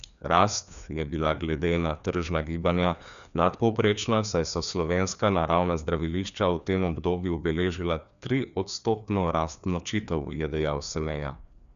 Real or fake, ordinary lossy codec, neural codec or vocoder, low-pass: fake; none; codec, 16 kHz, 2 kbps, FreqCodec, larger model; 7.2 kHz